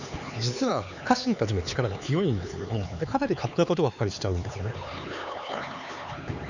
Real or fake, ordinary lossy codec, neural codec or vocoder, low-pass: fake; none; codec, 16 kHz, 4 kbps, X-Codec, HuBERT features, trained on LibriSpeech; 7.2 kHz